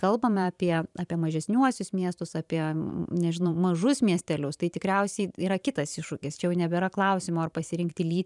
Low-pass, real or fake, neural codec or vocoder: 10.8 kHz; fake; vocoder, 44.1 kHz, 128 mel bands every 512 samples, BigVGAN v2